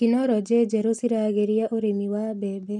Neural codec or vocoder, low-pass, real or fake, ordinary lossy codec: none; none; real; none